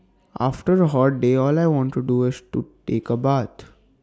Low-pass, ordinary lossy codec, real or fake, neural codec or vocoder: none; none; real; none